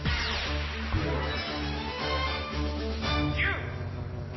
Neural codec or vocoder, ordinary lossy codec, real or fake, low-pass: none; MP3, 24 kbps; real; 7.2 kHz